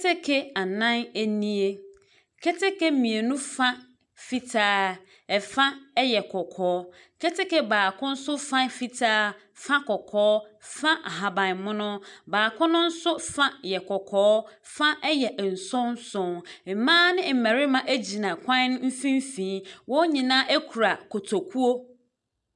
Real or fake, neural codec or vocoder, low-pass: real; none; 10.8 kHz